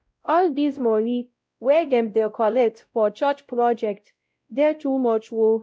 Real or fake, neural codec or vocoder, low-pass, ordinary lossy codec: fake; codec, 16 kHz, 0.5 kbps, X-Codec, WavLM features, trained on Multilingual LibriSpeech; none; none